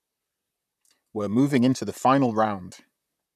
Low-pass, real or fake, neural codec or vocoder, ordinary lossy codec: 14.4 kHz; fake; vocoder, 44.1 kHz, 128 mel bands, Pupu-Vocoder; none